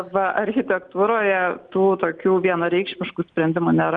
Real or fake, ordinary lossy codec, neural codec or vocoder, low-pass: real; Opus, 32 kbps; none; 9.9 kHz